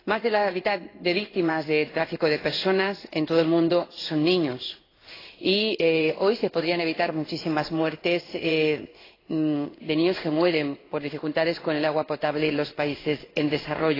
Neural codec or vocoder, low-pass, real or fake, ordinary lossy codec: codec, 16 kHz in and 24 kHz out, 1 kbps, XY-Tokenizer; 5.4 kHz; fake; AAC, 24 kbps